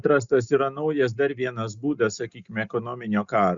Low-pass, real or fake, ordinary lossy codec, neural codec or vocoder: 7.2 kHz; real; Opus, 64 kbps; none